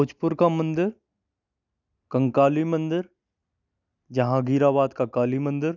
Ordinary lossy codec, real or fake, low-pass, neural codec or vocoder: none; real; 7.2 kHz; none